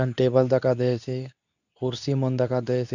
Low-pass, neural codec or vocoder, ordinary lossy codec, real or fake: 7.2 kHz; codec, 24 kHz, 0.9 kbps, WavTokenizer, medium speech release version 2; none; fake